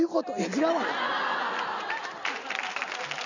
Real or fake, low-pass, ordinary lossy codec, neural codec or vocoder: real; 7.2 kHz; none; none